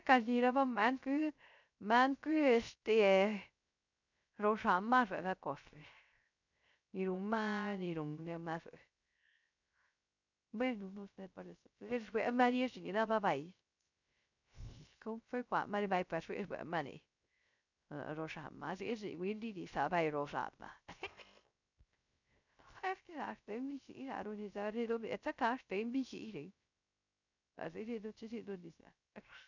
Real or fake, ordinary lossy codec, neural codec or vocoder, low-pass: fake; none; codec, 16 kHz, 0.3 kbps, FocalCodec; 7.2 kHz